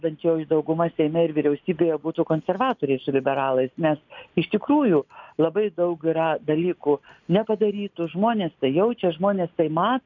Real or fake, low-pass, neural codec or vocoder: real; 7.2 kHz; none